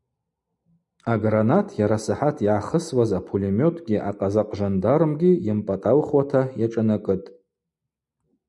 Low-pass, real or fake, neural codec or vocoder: 10.8 kHz; real; none